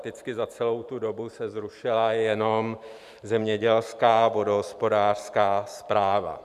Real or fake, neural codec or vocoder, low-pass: fake; vocoder, 44.1 kHz, 128 mel bands every 512 samples, BigVGAN v2; 14.4 kHz